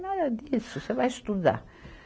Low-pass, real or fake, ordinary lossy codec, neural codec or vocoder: none; real; none; none